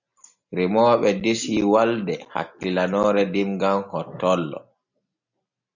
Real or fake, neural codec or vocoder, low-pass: real; none; 7.2 kHz